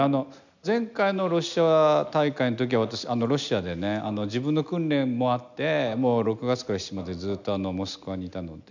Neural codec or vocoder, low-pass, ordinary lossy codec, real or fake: none; 7.2 kHz; none; real